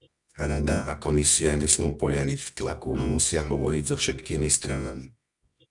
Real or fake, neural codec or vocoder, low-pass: fake; codec, 24 kHz, 0.9 kbps, WavTokenizer, medium music audio release; 10.8 kHz